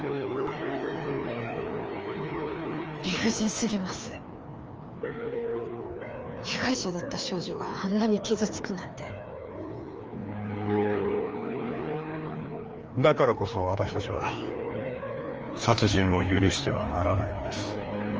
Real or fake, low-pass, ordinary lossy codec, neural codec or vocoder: fake; 7.2 kHz; Opus, 24 kbps; codec, 16 kHz, 2 kbps, FreqCodec, larger model